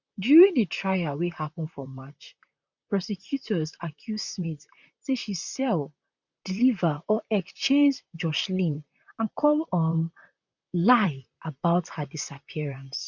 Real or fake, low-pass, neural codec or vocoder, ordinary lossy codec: fake; 7.2 kHz; vocoder, 44.1 kHz, 128 mel bands, Pupu-Vocoder; none